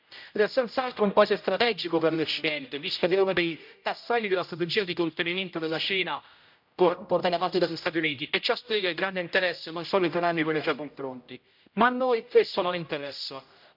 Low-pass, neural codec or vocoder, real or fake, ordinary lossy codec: 5.4 kHz; codec, 16 kHz, 0.5 kbps, X-Codec, HuBERT features, trained on general audio; fake; MP3, 48 kbps